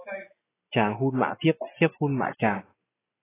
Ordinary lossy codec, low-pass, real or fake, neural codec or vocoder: AAC, 16 kbps; 3.6 kHz; real; none